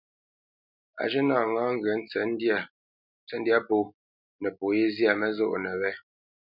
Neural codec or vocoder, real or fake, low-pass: none; real; 5.4 kHz